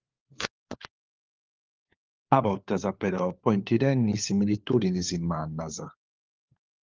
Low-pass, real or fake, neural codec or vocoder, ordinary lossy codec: 7.2 kHz; fake; codec, 16 kHz, 4 kbps, FunCodec, trained on LibriTTS, 50 frames a second; Opus, 32 kbps